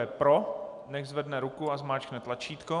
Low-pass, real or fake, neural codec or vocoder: 10.8 kHz; real; none